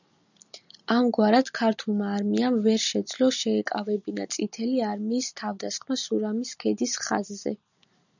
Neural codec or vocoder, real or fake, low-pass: none; real; 7.2 kHz